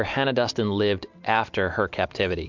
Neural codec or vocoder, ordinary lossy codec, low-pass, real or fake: none; MP3, 64 kbps; 7.2 kHz; real